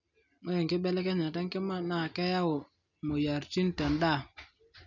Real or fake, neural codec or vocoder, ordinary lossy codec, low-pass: real; none; none; 7.2 kHz